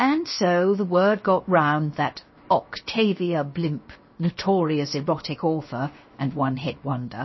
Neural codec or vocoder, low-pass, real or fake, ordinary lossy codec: codec, 16 kHz, 0.7 kbps, FocalCodec; 7.2 kHz; fake; MP3, 24 kbps